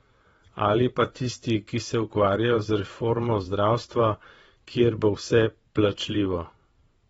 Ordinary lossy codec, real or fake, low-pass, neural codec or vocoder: AAC, 24 kbps; fake; 9.9 kHz; vocoder, 22.05 kHz, 80 mel bands, WaveNeXt